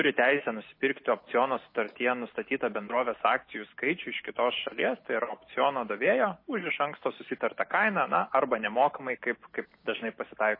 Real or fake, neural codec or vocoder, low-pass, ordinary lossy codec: real; none; 5.4 kHz; MP3, 24 kbps